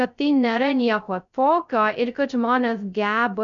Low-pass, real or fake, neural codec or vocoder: 7.2 kHz; fake; codec, 16 kHz, 0.2 kbps, FocalCodec